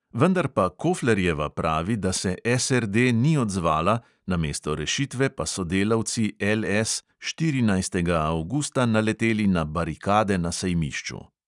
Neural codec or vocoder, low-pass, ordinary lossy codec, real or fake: none; 10.8 kHz; none; real